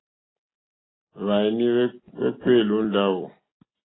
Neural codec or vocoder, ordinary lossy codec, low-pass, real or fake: none; AAC, 16 kbps; 7.2 kHz; real